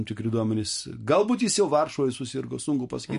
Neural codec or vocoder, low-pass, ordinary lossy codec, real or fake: none; 10.8 kHz; MP3, 48 kbps; real